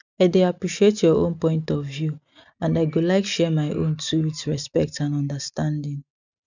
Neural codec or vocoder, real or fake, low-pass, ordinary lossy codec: none; real; 7.2 kHz; none